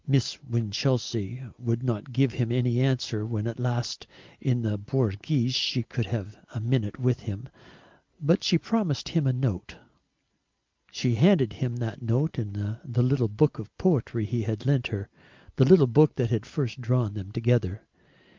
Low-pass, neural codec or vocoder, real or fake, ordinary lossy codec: 7.2 kHz; none; real; Opus, 24 kbps